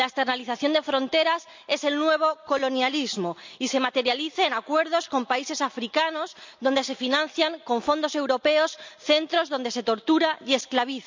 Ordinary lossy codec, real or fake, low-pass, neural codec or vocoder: MP3, 64 kbps; real; 7.2 kHz; none